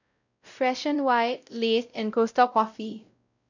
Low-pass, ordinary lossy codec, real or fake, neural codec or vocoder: 7.2 kHz; none; fake; codec, 16 kHz, 0.5 kbps, X-Codec, WavLM features, trained on Multilingual LibriSpeech